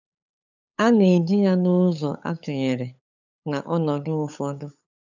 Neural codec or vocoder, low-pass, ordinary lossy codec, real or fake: codec, 16 kHz, 8 kbps, FunCodec, trained on LibriTTS, 25 frames a second; 7.2 kHz; none; fake